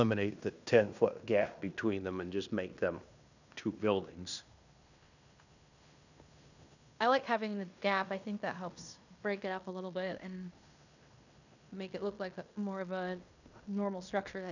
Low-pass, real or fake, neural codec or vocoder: 7.2 kHz; fake; codec, 16 kHz in and 24 kHz out, 0.9 kbps, LongCat-Audio-Codec, fine tuned four codebook decoder